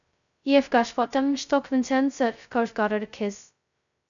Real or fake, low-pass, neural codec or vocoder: fake; 7.2 kHz; codec, 16 kHz, 0.2 kbps, FocalCodec